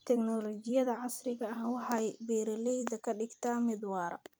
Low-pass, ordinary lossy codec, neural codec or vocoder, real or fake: none; none; vocoder, 44.1 kHz, 128 mel bands every 256 samples, BigVGAN v2; fake